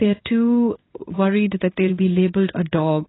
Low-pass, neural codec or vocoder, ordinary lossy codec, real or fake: 7.2 kHz; codec, 16 kHz in and 24 kHz out, 1 kbps, XY-Tokenizer; AAC, 16 kbps; fake